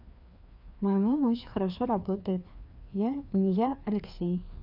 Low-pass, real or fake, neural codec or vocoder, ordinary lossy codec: 5.4 kHz; fake; codec, 16 kHz, 2 kbps, FreqCodec, larger model; none